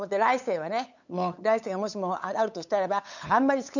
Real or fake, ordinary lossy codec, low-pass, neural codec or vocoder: fake; none; 7.2 kHz; codec, 16 kHz, 8 kbps, FunCodec, trained on LibriTTS, 25 frames a second